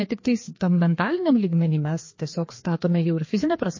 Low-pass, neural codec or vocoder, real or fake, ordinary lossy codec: 7.2 kHz; codec, 16 kHz, 2 kbps, FreqCodec, larger model; fake; MP3, 32 kbps